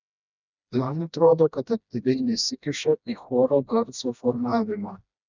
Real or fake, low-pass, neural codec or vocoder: fake; 7.2 kHz; codec, 16 kHz, 1 kbps, FreqCodec, smaller model